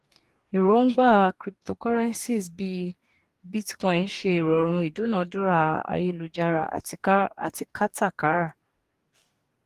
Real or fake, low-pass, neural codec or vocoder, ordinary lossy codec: fake; 14.4 kHz; codec, 44.1 kHz, 2.6 kbps, DAC; Opus, 24 kbps